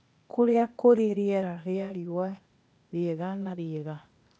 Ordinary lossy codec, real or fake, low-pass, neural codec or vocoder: none; fake; none; codec, 16 kHz, 0.8 kbps, ZipCodec